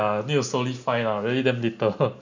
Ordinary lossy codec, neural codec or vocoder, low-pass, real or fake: none; none; 7.2 kHz; real